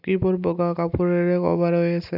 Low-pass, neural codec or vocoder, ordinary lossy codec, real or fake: 5.4 kHz; none; AAC, 48 kbps; real